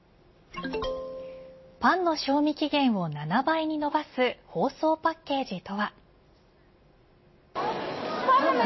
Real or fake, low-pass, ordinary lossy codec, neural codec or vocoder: real; 7.2 kHz; MP3, 24 kbps; none